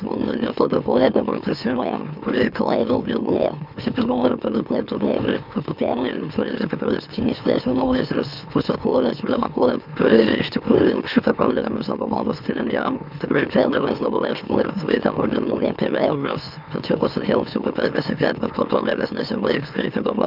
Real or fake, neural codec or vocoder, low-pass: fake; autoencoder, 44.1 kHz, a latent of 192 numbers a frame, MeloTTS; 5.4 kHz